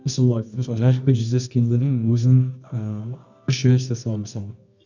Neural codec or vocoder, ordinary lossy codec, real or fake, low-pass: codec, 24 kHz, 0.9 kbps, WavTokenizer, medium music audio release; none; fake; 7.2 kHz